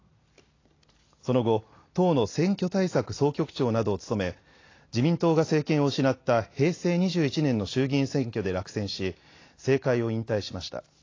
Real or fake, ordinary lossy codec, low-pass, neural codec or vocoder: real; AAC, 32 kbps; 7.2 kHz; none